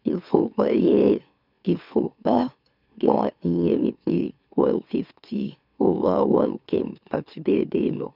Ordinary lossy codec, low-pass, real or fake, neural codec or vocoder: none; 5.4 kHz; fake; autoencoder, 44.1 kHz, a latent of 192 numbers a frame, MeloTTS